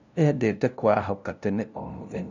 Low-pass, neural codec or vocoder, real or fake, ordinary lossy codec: 7.2 kHz; codec, 16 kHz, 0.5 kbps, FunCodec, trained on LibriTTS, 25 frames a second; fake; none